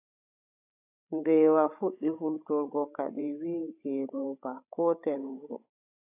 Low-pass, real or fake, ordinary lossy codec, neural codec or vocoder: 3.6 kHz; fake; AAC, 32 kbps; codec, 16 kHz, 16 kbps, FreqCodec, larger model